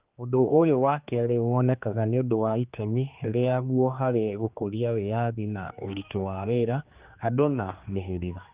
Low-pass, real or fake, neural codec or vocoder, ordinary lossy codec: 3.6 kHz; fake; codec, 16 kHz, 2 kbps, X-Codec, HuBERT features, trained on general audio; Opus, 24 kbps